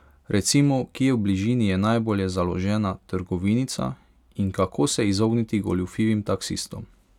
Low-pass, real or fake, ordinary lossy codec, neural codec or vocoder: 19.8 kHz; real; none; none